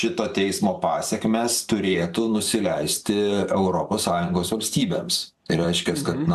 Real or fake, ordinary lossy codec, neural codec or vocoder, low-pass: fake; AAC, 96 kbps; vocoder, 44.1 kHz, 128 mel bands every 512 samples, BigVGAN v2; 14.4 kHz